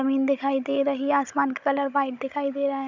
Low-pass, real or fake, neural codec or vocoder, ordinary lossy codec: 7.2 kHz; fake; codec, 16 kHz, 16 kbps, FunCodec, trained on Chinese and English, 50 frames a second; none